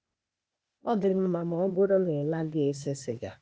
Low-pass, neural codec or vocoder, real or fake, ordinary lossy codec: none; codec, 16 kHz, 0.8 kbps, ZipCodec; fake; none